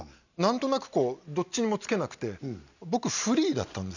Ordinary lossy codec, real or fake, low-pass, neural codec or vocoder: none; real; 7.2 kHz; none